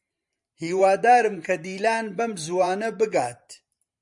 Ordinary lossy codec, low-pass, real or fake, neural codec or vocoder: MP3, 96 kbps; 10.8 kHz; fake; vocoder, 44.1 kHz, 128 mel bands every 512 samples, BigVGAN v2